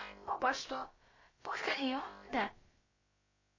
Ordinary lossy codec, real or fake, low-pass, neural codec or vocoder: MP3, 32 kbps; fake; 7.2 kHz; codec, 16 kHz, about 1 kbps, DyCAST, with the encoder's durations